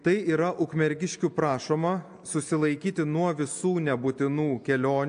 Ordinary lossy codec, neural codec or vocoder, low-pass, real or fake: AAC, 64 kbps; none; 9.9 kHz; real